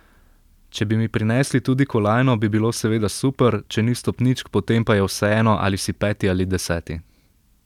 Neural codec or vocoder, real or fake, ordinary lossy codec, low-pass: none; real; none; 19.8 kHz